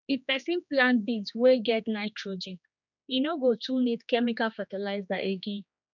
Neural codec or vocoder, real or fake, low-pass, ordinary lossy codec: codec, 16 kHz, 2 kbps, X-Codec, HuBERT features, trained on general audio; fake; 7.2 kHz; none